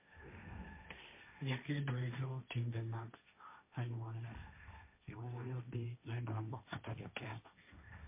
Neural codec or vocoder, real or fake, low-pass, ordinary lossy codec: codec, 16 kHz, 1.1 kbps, Voila-Tokenizer; fake; 3.6 kHz; MP3, 24 kbps